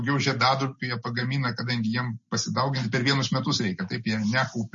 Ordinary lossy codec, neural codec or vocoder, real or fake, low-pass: MP3, 32 kbps; none; real; 7.2 kHz